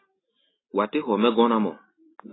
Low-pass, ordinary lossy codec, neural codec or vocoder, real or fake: 7.2 kHz; AAC, 16 kbps; none; real